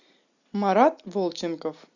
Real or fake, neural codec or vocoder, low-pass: real; none; 7.2 kHz